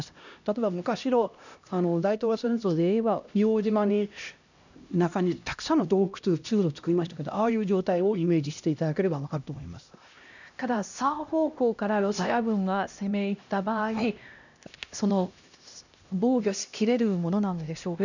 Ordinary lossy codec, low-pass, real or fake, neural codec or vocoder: none; 7.2 kHz; fake; codec, 16 kHz, 1 kbps, X-Codec, HuBERT features, trained on LibriSpeech